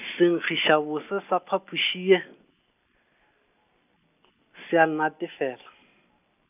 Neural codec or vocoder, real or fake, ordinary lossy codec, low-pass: none; real; none; 3.6 kHz